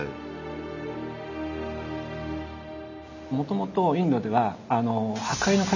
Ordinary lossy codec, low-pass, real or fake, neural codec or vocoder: none; 7.2 kHz; real; none